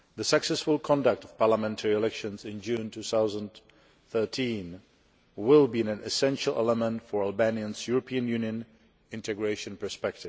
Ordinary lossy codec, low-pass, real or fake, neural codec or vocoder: none; none; real; none